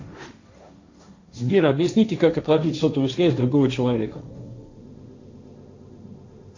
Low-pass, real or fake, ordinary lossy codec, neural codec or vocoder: 7.2 kHz; fake; AAC, 48 kbps; codec, 16 kHz, 1.1 kbps, Voila-Tokenizer